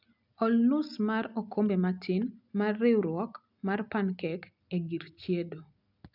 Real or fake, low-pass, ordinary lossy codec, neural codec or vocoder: real; 5.4 kHz; none; none